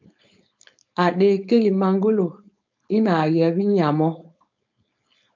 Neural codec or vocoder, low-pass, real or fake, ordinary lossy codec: codec, 16 kHz, 4.8 kbps, FACodec; 7.2 kHz; fake; MP3, 64 kbps